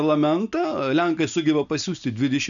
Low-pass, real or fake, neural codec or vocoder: 7.2 kHz; real; none